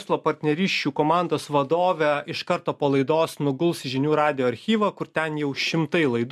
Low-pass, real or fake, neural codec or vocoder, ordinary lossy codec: 14.4 kHz; real; none; MP3, 96 kbps